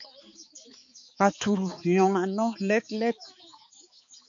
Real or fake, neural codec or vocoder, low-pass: fake; codec, 16 kHz, 4 kbps, X-Codec, HuBERT features, trained on balanced general audio; 7.2 kHz